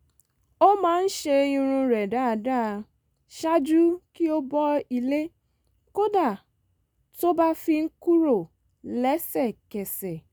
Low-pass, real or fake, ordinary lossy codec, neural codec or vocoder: none; real; none; none